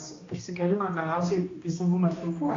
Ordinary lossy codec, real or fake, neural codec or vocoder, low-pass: AAC, 32 kbps; fake; codec, 16 kHz, 1 kbps, X-Codec, HuBERT features, trained on balanced general audio; 7.2 kHz